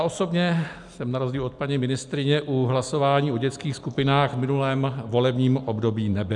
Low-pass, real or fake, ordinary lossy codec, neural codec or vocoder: 10.8 kHz; real; MP3, 96 kbps; none